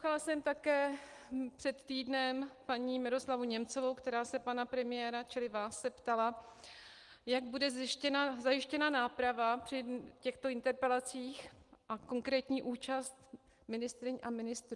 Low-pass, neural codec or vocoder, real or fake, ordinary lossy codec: 10.8 kHz; none; real; Opus, 24 kbps